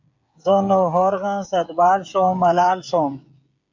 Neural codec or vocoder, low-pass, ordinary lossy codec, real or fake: codec, 16 kHz, 16 kbps, FreqCodec, smaller model; 7.2 kHz; MP3, 64 kbps; fake